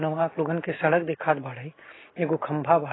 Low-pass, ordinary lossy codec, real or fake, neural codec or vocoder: 7.2 kHz; AAC, 16 kbps; real; none